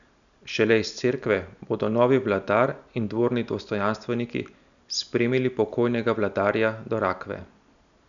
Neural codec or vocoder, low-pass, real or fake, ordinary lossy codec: none; 7.2 kHz; real; none